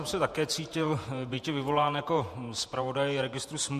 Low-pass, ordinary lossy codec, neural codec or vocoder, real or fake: 14.4 kHz; MP3, 64 kbps; vocoder, 48 kHz, 128 mel bands, Vocos; fake